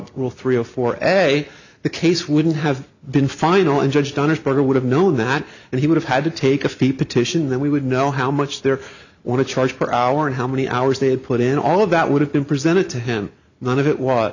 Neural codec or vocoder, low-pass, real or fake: none; 7.2 kHz; real